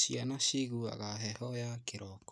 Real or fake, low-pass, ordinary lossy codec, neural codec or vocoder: real; none; none; none